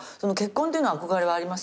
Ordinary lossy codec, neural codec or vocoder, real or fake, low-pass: none; none; real; none